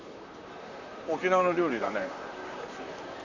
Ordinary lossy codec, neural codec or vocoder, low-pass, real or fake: none; vocoder, 44.1 kHz, 128 mel bands, Pupu-Vocoder; 7.2 kHz; fake